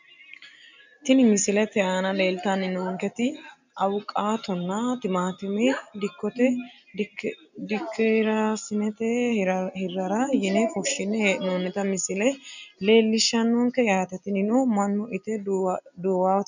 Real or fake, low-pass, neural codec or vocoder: real; 7.2 kHz; none